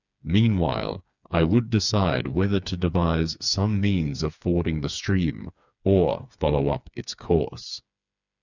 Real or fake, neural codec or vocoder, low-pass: fake; codec, 16 kHz, 4 kbps, FreqCodec, smaller model; 7.2 kHz